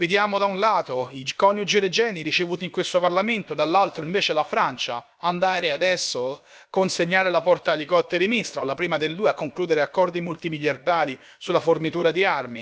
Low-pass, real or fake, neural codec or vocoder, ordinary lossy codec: none; fake; codec, 16 kHz, about 1 kbps, DyCAST, with the encoder's durations; none